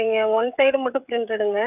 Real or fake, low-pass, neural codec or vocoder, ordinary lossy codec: fake; 3.6 kHz; codec, 16 kHz, 16 kbps, FreqCodec, smaller model; none